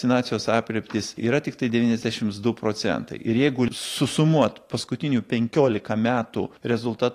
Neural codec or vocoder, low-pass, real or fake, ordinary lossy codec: vocoder, 44.1 kHz, 128 mel bands every 512 samples, BigVGAN v2; 14.4 kHz; fake; AAC, 48 kbps